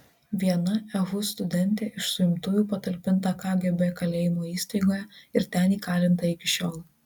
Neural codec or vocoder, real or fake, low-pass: none; real; 19.8 kHz